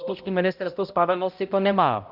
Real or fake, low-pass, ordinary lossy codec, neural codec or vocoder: fake; 5.4 kHz; Opus, 24 kbps; codec, 16 kHz, 0.5 kbps, X-Codec, HuBERT features, trained on balanced general audio